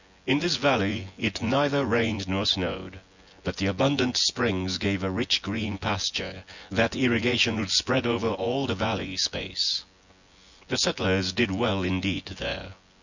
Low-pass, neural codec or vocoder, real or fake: 7.2 kHz; vocoder, 24 kHz, 100 mel bands, Vocos; fake